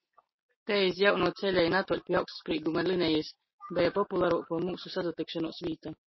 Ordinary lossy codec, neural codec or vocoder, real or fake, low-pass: MP3, 24 kbps; none; real; 7.2 kHz